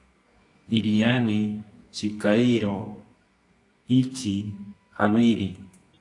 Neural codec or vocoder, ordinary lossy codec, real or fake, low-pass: codec, 24 kHz, 0.9 kbps, WavTokenizer, medium music audio release; AAC, 48 kbps; fake; 10.8 kHz